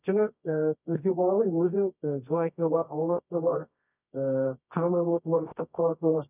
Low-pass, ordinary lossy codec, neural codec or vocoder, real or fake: 3.6 kHz; none; codec, 24 kHz, 0.9 kbps, WavTokenizer, medium music audio release; fake